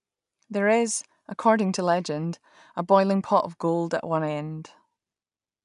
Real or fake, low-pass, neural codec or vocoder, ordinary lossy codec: real; 10.8 kHz; none; none